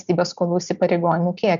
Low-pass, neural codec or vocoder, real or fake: 7.2 kHz; none; real